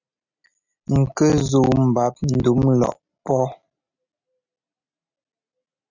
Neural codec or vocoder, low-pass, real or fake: none; 7.2 kHz; real